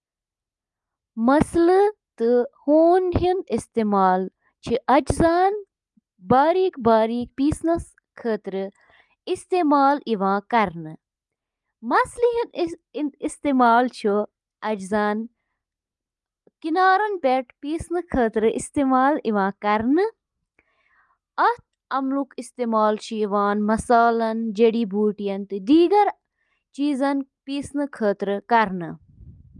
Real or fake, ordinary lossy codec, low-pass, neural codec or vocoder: real; Opus, 32 kbps; 10.8 kHz; none